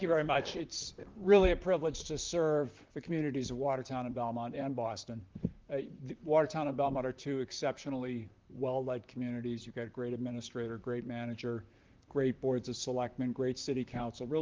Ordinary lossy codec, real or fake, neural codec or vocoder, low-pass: Opus, 32 kbps; fake; vocoder, 44.1 kHz, 128 mel bands, Pupu-Vocoder; 7.2 kHz